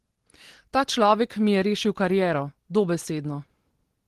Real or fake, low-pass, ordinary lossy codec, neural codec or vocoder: real; 14.4 kHz; Opus, 16 kbps; none